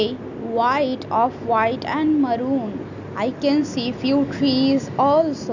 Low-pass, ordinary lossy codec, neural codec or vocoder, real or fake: 7.2 kHz; none; none; real